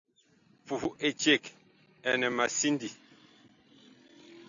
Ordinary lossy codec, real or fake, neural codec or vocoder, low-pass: AAC, 64 kbps; real; none; 7.2 kHz